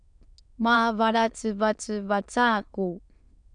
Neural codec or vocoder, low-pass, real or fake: autoencoder, 22.05 kHz, a latent of 192 numbers a frame, VITS, trained on many speakers; 9.9 kHz; fake